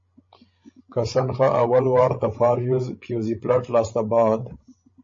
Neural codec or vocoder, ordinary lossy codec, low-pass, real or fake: codec, 16 kHz, 16 kbps, FreqCodec, larger model; MP3, 32 kbps; 7.2 kHz; fake